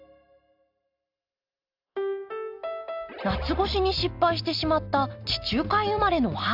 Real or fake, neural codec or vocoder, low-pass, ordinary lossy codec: real; none; 5.4 kHz; AAC, 48 kbps